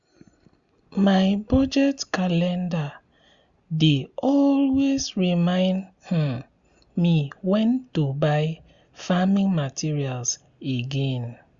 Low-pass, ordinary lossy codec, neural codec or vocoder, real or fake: 7.2 kHz; Opus, 64 kbps; none; real